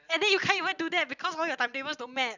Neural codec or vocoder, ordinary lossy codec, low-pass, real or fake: none; none; 7.2 kHz; real